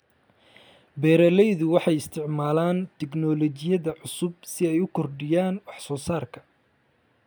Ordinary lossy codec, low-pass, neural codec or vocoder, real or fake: none; none; none; real